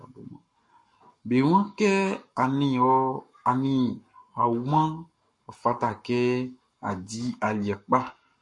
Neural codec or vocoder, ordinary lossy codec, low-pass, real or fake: codec, 44.1 kHz, 7.8 kbps, Pupu-Codec; MP3, 48 kbps; 10.8 kHz; fake